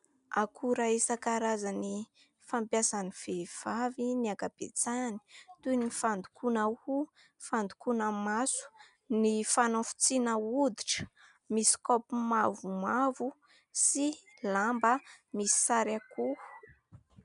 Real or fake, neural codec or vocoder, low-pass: real; none; 10.8 kHz